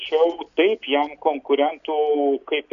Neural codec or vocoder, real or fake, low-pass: none; real; 7.2 kHz